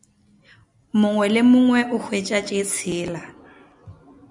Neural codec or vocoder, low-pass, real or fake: none; 10.8 kHz; real